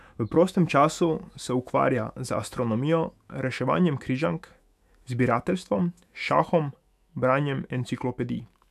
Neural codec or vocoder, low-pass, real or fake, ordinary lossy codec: autoencoder, 48 kHz, 128 numbers a frame, DAC-VAE, trained on Japanese speech; 14.4 kHz; fake; none